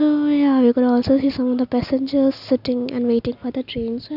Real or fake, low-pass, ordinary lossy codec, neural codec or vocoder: real; 5.4 kHz; none; none